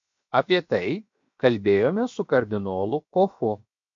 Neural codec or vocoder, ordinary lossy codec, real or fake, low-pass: codec, 16 kHz, 0.7 kbps, FocalCodec; MP3, 48 kbps; fake; 7.2 kHz